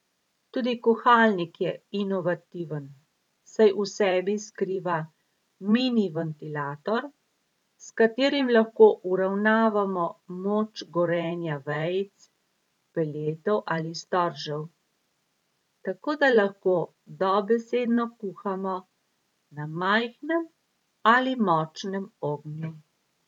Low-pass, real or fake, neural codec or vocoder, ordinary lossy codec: 19.8 kHz; fake; vocoder, 44.1 kHz, 128 mel bands every 512 samples, BigVGAN v2; none